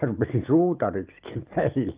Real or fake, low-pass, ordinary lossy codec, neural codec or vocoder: real; 3.6 kHz; Opus, 32 kbps; none